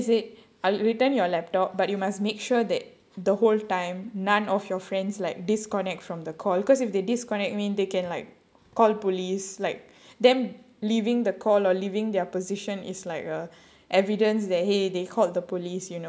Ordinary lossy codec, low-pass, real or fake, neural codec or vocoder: none; none; real; none